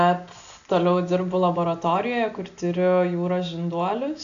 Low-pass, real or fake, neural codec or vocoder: 7.2 kHz; real; none